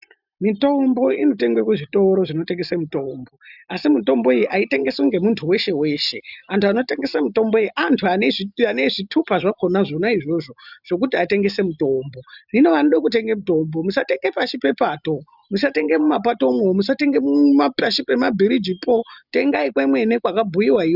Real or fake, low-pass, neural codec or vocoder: real; 5.4 kHz; none